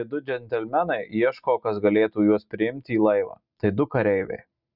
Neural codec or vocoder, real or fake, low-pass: none; real; 5.4 kHz